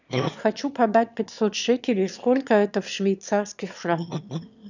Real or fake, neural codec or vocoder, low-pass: fake; autoencoder, 22.05 kHz, a latent of 192 numbers a frame, VITS, trained on one speaker; 7.2 kHz